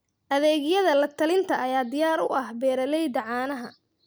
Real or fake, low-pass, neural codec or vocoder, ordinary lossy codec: real; none; none; none